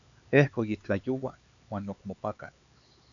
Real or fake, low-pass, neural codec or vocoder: fake; 7.2 kHz; codec, 16 kHz, 4 kbps, X-Codec, HuBERT features, trained on LibriSpeech